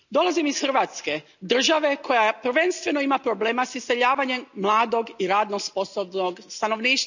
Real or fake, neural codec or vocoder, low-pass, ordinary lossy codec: real; none; 7.2 kHz; none